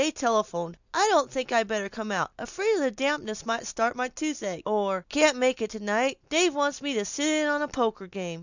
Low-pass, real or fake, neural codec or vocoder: 7.2 kHz; real; none